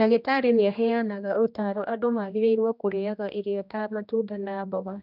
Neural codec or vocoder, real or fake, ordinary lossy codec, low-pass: codec, 16 kHz, 1 kbps, X-Codec, HuBERT features, trained on general audio; fake; none; 5.4 kHz